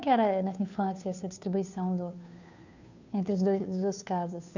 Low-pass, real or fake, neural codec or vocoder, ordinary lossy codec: 7.2 kHz; fake; codec, 16 kHz, 2 kbps, FunCodec, trained on Chinese and English, 25 frames a second; none